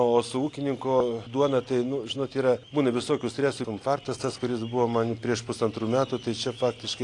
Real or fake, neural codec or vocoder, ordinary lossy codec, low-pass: real; none; AAC, 48 kbps; 10.8 kHz